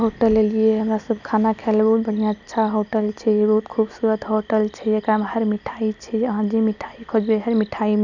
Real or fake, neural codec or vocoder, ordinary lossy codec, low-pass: real; none; none; 7.2 kHz